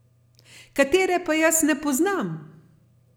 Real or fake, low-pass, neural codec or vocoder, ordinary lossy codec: real; none; none; none